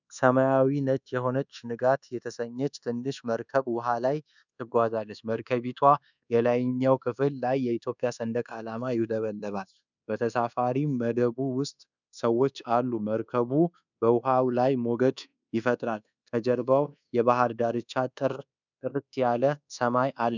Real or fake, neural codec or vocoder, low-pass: fake; codec, 24 kHz, 1.2 kbps, DualCodec; 7.2 kHz